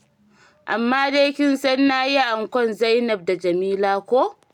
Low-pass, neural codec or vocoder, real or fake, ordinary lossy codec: 19.8 kHz; none; real; none